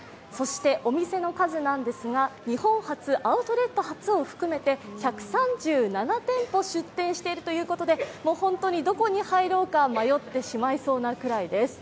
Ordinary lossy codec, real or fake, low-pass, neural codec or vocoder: none; real; none; none